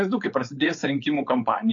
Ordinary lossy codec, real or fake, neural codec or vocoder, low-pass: AAC, 48 kbps; fake; codec, 16 kHz, 4.8 kbps, FACodec; 7.2 kHz